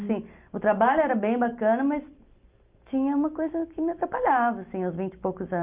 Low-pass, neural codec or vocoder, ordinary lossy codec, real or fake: 3.6 kHz; none; Opus, 32 kbps; real